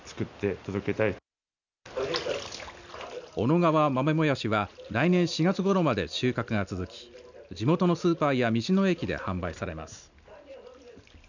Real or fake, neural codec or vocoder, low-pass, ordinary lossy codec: real; none; 7.2 kHz; none